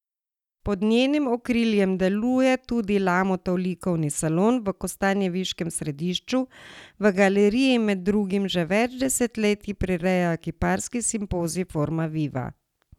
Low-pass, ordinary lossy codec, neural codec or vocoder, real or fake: 19.8 kHz; none; none; real